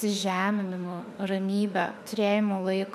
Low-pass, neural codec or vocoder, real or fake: 14.4 kHz; autoencoder, 48 kHz, 32 numbers a frame, DAC-VAE, trained on Japanese speech; fake